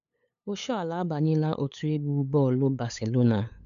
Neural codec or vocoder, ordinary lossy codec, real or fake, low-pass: codec, 16 kHz, 8 kbps, FunCodec, trained on LibriTTS, 25 frames a second; none; fake; 7.2 kHz